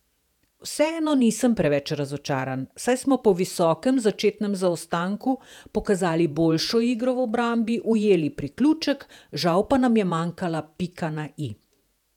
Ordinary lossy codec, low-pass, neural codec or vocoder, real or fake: none; 19.8 kHz; vocoder, 48 kHz, 128 mel bands, Vocos; fake